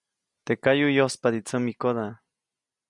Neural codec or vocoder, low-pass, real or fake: none; 10.8 kHz; real